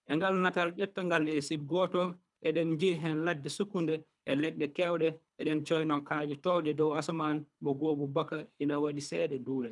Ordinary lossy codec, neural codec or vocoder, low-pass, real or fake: none; codec, 24 kHz, 3 kbps, HILCodec; 10.8 kHz; fake